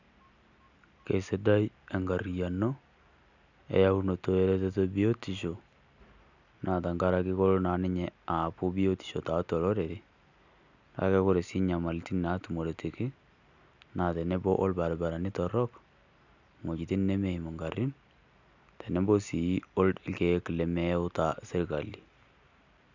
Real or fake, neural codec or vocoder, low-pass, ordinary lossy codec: real; none; 7.2 kHz; none